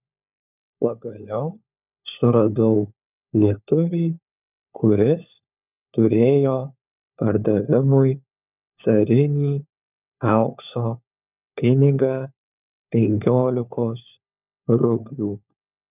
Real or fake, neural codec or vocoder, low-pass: fake; codec, 16 kHz, 4 kbps, FunCodec, trained on LibriTTS, 50 frames a second; 3.6 kHz